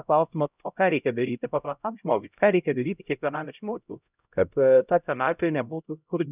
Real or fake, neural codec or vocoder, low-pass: fake; codec, 16 kHz, 0.5 kbps, X-Codec, HuBERT features, trained on LibriSpeech; 3.6 kHz